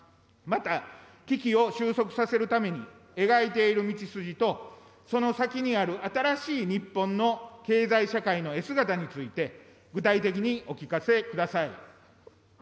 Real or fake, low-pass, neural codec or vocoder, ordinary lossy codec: real; none; none; none